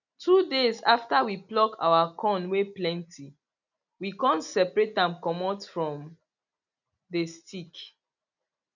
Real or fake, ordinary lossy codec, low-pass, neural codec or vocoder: real; none; 7.2 kHz; none